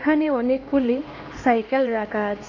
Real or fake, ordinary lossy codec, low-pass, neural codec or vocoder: fake; AAC, 32 kbps; 7.2 kHz; codec, 16 kHz, 2 kbps, X-Codec, HuBERT features, trained on LibriSpeech